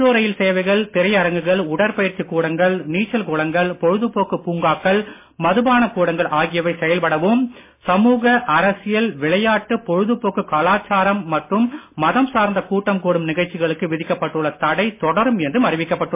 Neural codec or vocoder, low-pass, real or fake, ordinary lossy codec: none; 3.6 kHz; real; MP3, 24 kbps